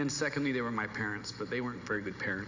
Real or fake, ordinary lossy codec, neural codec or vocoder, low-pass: real; AAC, 48 kbps; none; 7.2 kHz